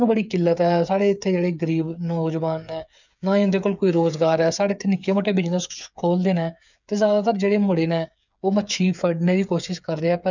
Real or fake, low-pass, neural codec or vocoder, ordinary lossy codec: fake; 7.2 kHz; codec, 16 kHz, 8 kbps, FreqCodec, smaller model; none